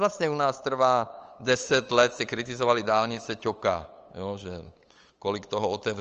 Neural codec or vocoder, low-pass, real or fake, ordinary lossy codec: codec, 16 kHz, 8 kbps, FunCodec, trained on LibriTTS, 25 frames a second; 7.2 kHz; fake; Opus, 24 kbps